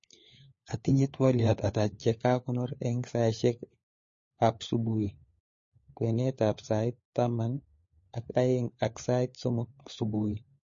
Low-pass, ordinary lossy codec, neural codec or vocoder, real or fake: 7.2 kHz; MP3, 32 kbps; codec, 16 kHz, 4 kbps, FunCodec, trained on LibriTTS, 50 frames a second; fake